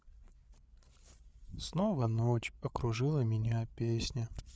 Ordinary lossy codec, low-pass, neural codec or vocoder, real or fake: none; none; codec, 16 kHz, 8 kbps, FreqCodec, larger model; fake